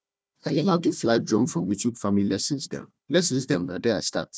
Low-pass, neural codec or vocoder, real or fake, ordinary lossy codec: none; codec, 16 kHz, 1 kbps, FunCodec, trained on Chinese and English, 50 frames a second; fake; none